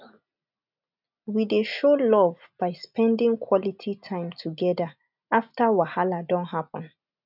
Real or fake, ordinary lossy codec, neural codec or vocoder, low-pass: real; none; none; 5.4 kHz